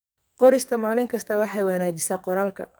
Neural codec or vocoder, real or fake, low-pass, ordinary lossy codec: codec, 44.1 kHz, 2.6 kbps, SNAC; fake; none; none